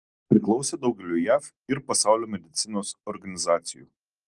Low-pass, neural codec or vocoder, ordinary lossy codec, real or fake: 10.8 kHz; none; Opus, 24 kbps; real